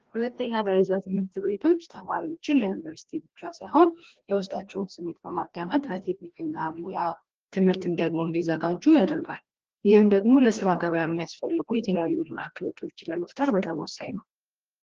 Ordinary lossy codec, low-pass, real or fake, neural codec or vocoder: Opus, 16 kbps; 7.2 kHz; fake; codec, 16 kHz, 1 kbps, FreqCodec, larger model